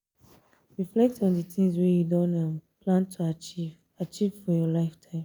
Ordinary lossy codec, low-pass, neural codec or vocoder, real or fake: none; none; none; real